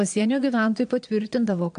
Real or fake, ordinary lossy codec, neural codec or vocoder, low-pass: fake; AAC, 64 kbps; vocoder, 22.05 kHz, 80 mel bands, Vocos; 9.9 kHz